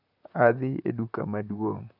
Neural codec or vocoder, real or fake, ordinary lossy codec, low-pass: vocoder, 44.1 kHz, 128 mel bands, Pupu-Vocoder; fake; none; 5.4 kHz